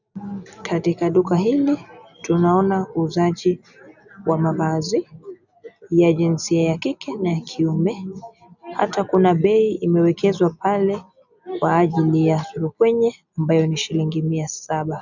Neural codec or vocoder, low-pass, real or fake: none; 7.2 kHz; real